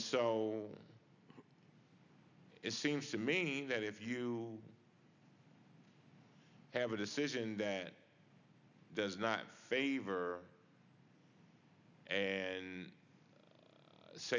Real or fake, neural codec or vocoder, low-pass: real; none; 7.2 kHz